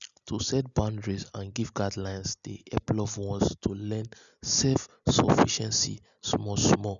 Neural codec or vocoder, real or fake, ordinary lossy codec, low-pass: none; real; none; 7.2 kHz